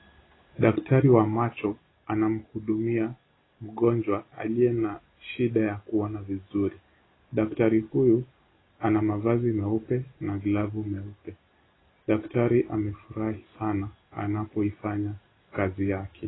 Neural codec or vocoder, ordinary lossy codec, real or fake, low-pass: none; AAC, 16 kbps; real; 7.2 kHz